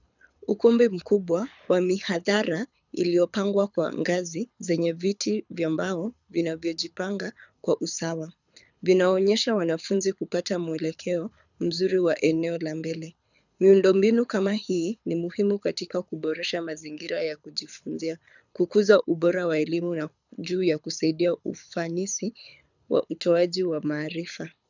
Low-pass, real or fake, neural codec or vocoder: 7.2 kHz; fake; codec, 24 kHz, 6 kbps, HILCodec